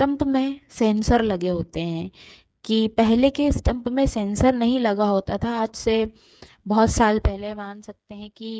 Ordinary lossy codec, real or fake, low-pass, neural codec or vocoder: none; fake; none; codec, 16 kHz, 8 kbps, FreqCodec, smaller model